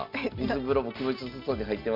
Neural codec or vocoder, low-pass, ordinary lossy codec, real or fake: none; 5.4 kHz; MP3, 48 kbps; real